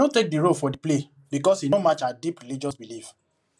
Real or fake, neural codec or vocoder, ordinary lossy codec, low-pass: real; none; none; none